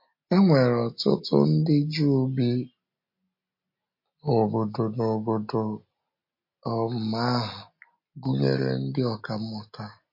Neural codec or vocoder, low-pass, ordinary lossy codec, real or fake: none; 5.4 kHz; MP3, 32 kbps; real